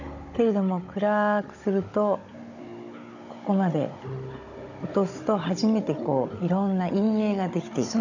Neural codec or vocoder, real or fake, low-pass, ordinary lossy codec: codec, 16 kHz, 16 kbps, FunCodec, trained on Chinese and English, 50 frames a second; fake; 7.2 kHz; none